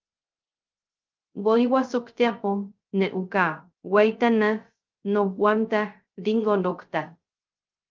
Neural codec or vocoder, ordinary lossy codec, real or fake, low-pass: codec, 16 kHz, 0.3 kbps, FocalCodec; Opus, 32 kbps; fake; 7.2 kHz